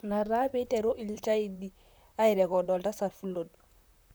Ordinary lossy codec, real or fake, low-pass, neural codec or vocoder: none; fake; none; vocoder, 44.1 kHz, 128 mel bands, Pupu-Vocoder